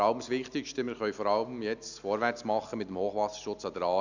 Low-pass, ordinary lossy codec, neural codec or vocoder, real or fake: 7.2 kHz; none; none; real